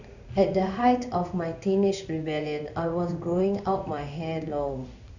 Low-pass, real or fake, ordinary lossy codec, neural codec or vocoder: 7.2 kHz; fake; none; codec, 16 kHz in and 24 kHz out, 1 kbps, XY-Tokenizer